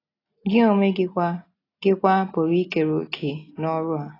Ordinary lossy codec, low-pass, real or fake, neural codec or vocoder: AAC, 24 kbps; 5.4 kHz; real; none